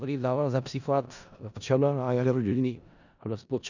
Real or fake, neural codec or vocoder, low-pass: fake; codec, 16 kHz in and 24 kHz out, 0.4 kbps, LongCat-Audio-Codec, four codebook decoder; 7.2 kHz